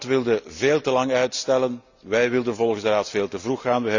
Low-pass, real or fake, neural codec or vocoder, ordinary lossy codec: 7.2 kHz; real; none; none